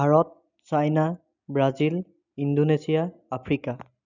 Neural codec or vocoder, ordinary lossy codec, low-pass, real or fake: none; none; 7.2 kHz; real